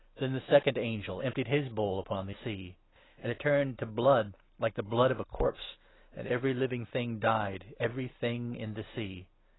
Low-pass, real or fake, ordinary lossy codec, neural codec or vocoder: 7.2 kHz; real; AAC, 16 kbps; none